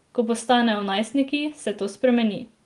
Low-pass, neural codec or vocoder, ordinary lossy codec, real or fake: 10.8 kHz; none; Opus, 24 kbps; real